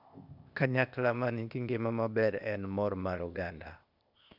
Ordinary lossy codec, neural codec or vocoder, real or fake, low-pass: none; codec, 16 kHz, 0.8 kbps, ZipCodec; fake; 5.4 kHz